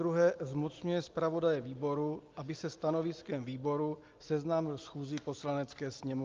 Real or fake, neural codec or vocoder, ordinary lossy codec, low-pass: real; none; Opus, 32 kbps; 7.2 kHz